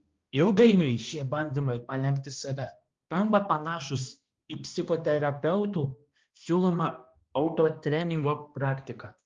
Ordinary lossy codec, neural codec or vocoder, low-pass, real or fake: Opus, 32 kbps; codec, 16 kHz, 1 kbps, X-Codec, HuBERT features, trained on balanced general audio; 7.2 kHz; fake